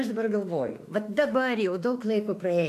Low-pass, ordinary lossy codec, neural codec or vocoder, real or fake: 14.4 kHz; AAC, 64 kbps; autoencoder, 48 kHz, 32 numbers a frame, DAC-VAE, trained on Japanese speech; fake